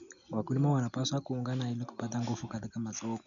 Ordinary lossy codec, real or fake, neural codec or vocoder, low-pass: none; real; none; 7.2 kHz